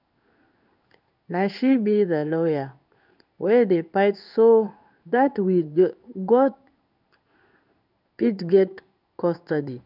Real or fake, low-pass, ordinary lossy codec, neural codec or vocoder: fake; 5.4 kHz; none; codec, 16 kHz in and 24 kHz out, 1 kbps, XY-Tokenizer